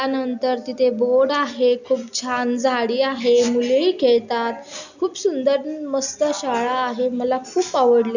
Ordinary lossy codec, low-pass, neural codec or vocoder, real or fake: none; 7.2 kHz; none; real